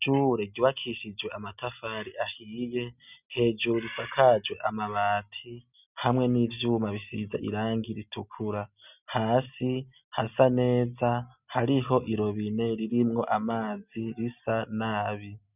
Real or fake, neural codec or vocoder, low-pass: real; none; 3.6 kHz